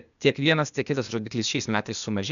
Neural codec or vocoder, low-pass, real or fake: codec, 16 kHz, 0.8 kbps, ZipCodec; 7.2 kHz; fake